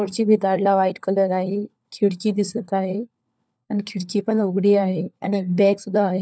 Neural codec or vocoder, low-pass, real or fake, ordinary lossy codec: codec, 16 kHz, 4 kbps, FunCodec, trained on LibriTTS, 50 frames a second; none; fake; none